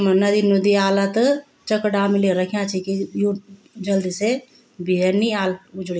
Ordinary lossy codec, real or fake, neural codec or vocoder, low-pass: none; real; none; none